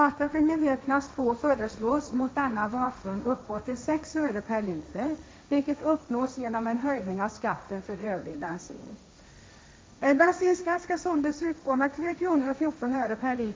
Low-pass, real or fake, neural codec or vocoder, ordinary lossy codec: none; fake; codec, 16 kHz, 1.1 kbps, Voila-Tokenizer; none